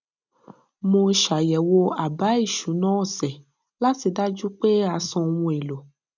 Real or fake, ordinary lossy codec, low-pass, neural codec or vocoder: real; none; 7.2 kHz; none